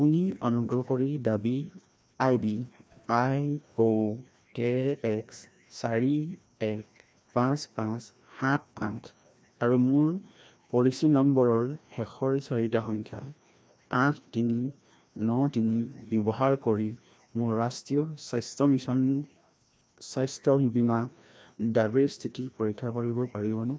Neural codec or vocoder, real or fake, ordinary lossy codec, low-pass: codec, 16 kHz, 1 kbps, FreqCodec, larger model; fake; none; none